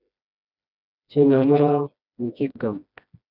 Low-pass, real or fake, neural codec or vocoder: 5.4 kHz; fake; codec, 16 kHz, 1 kbps, FreqCodec, smaller model